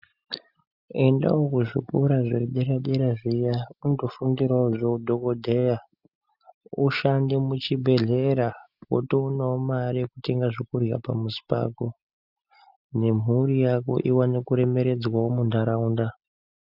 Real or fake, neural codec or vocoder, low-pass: real; none; 5.4 kHz